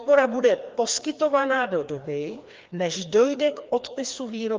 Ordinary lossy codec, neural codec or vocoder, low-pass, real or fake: Opus, 24 kbps; codec, 16 kHz, 2 kbps, FreqCodec, larger model; 7.2 kHz; fake